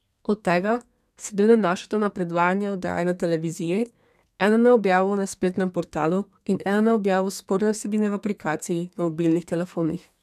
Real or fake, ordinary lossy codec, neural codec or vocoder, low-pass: fake; none; codec, 32 kHz, 1.9 kbps, SNAC; 14.4 kHz